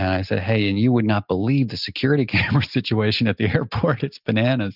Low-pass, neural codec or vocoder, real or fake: 5.4 kHz; none; real